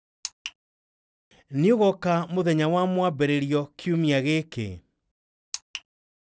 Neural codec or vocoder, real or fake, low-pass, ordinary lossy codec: none; real; none; none